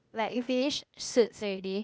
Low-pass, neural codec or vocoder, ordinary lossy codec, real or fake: none; codec, 16 kHz, 0.8 kbps, ZipCodec; none; fake